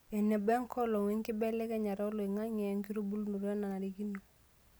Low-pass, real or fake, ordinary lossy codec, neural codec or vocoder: none; real; none; none